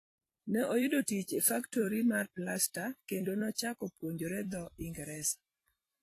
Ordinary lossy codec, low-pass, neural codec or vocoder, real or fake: AAC, 48 kbps; 14.4 kHz; vocoder, 44.1 kHz, 128 mel bands every 256 samples, BigVGAN v2; fake